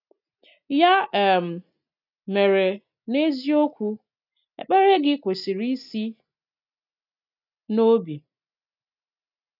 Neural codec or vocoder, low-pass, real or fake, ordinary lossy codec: none; 5.4 kHz; real; none